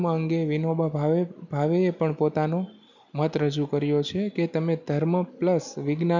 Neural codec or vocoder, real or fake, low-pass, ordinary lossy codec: none; real; 7.2 kHz; none